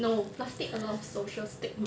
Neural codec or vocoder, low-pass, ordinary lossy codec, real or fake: none; none; none; real